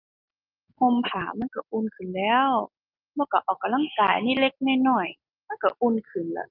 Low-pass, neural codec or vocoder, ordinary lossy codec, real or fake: 5.4 kHz; none; Opus, 32 kbps; real